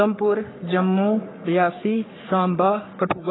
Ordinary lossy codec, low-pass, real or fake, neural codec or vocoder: AAC, 16 kbps; 7.2 kHz; fake; codec, 44.1 kHz, 3.4 kbps, Pupu-Codec